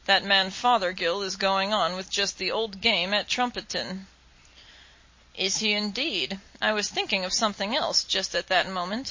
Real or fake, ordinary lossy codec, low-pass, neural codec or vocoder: real; MP3, 32 kbps; 7.2 kHz; none